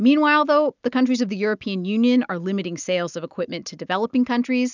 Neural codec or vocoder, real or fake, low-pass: none; real; 7.2 kHz